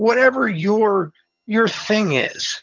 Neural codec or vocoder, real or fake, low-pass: vocoder, 22.05 kHz, 80 mel bands, HiFi-GAN; fake; 7.2 kHz